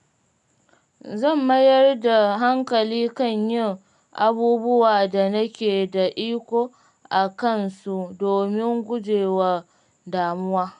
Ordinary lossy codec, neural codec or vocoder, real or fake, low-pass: none; none; real; 10.8 kHz